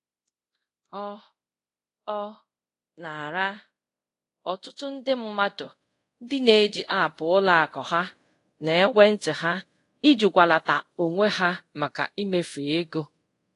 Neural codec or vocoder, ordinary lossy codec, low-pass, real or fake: codec, 24 kHz, 0.5 kbps, DualCodec; AAC, 48 kbps; 10.8 kHz; fake